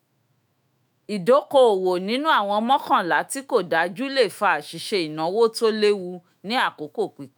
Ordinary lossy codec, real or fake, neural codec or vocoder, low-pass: none; fake; autoencoder, 48 kHz, 128 numbers a frame, DAC-VAE, trained on Japanese speech; none